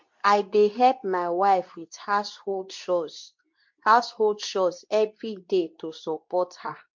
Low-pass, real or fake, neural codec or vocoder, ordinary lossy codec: 7.2 kHz; fake; codec, 24 kHz, 0.9 kbps, WavTokenizer, medium speech release version 2; MP3, 48 kbps